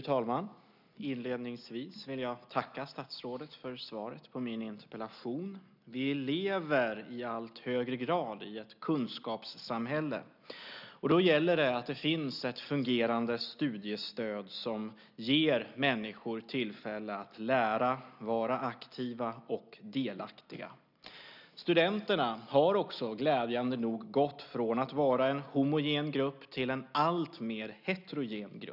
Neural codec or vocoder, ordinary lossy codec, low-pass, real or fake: none; none; 5.4 kHz; real